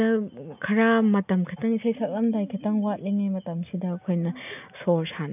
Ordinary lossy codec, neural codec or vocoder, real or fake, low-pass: none; none; real; 3.6 kHz